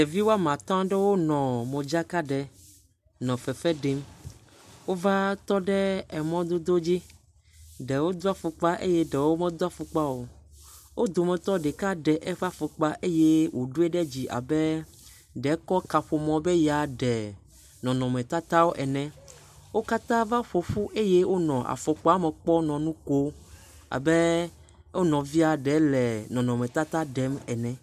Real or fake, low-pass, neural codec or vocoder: real; 14.4 kHz; none